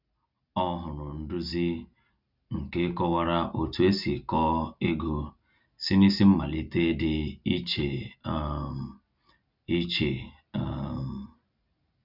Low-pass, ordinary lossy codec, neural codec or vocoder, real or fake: 5.4 kHz; none; none; real